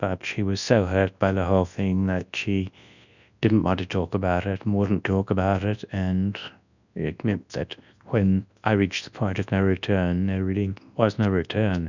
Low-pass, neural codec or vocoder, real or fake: 7.2 kHz; codec, 24 kHz, 0.9 kbps, WavTokenizer, large speech release; fake